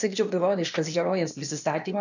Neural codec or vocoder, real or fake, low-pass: codec, 16 kHz, 0.8 kbps, ZipCodec; fake; 7.2 kHz